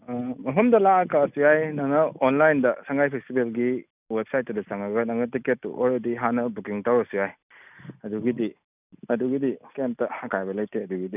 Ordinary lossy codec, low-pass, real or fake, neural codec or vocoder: none; 3.6 kHz; real; none